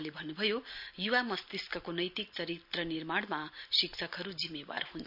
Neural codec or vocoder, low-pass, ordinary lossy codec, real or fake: none; 5.4 kHz; none; real